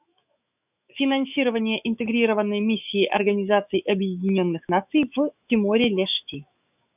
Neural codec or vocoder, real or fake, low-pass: autoencoder, 48 kHz, 128 numbers a frame, DAC-VAE, trained on Japanese speech; fake; 3.6 kHz